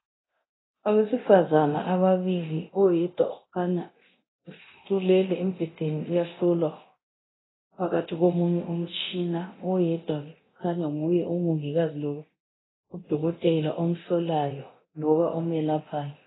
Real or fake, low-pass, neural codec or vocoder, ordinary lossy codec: fake; 7.2 kHz; codec, 24 kHz, 0.9 kbps, DualCodec; AAC, 16 kbps